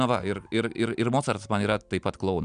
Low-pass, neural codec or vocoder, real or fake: 9.9 kHz; none; real